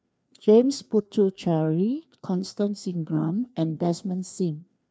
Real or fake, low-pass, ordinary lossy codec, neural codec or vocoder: fake; none; none; codec, 16 kHz, 2 kbps, FreqCodec, larger model